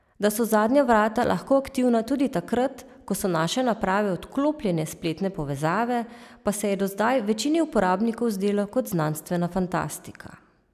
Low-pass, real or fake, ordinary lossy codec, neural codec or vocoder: 14.4 kHz; real; none; none